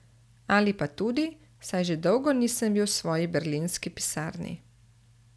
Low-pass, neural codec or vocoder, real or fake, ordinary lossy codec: none; none; real; none